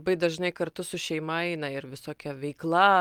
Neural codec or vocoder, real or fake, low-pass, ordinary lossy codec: none; real; 19.8 kHz; Opus, 32 kbps